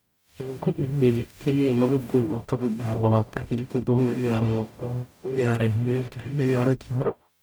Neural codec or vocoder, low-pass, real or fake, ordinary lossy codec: codec, 44.1 kHz, 0.9 kbps, DAC; none; fake; none